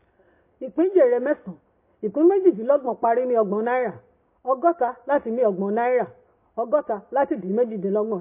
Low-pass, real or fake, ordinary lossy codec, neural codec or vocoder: 3.6 kHz; real; MP3, 24 kbps; none